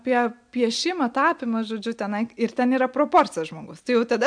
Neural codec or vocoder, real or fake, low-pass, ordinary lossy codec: none; real; 9.9 kHz; MP3, 64 kbps